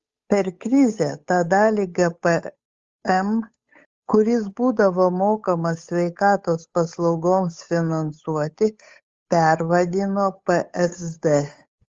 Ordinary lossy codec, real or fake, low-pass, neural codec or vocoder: Opus, 32 kbps; fake; 7.2 kHz; codec, 16 kHz, 8 kbps, FunCodec, trained on Chinese and English, 25 frames a second